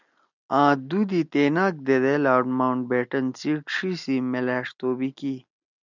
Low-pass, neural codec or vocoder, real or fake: 7.2 kHz; none; real